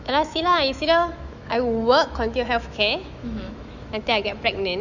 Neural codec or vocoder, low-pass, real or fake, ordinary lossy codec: autoencoder, 48 kHz, 128 numbers a frame, DAC-VAE, trained on Japanese speech; 7.2 kHz; fake; none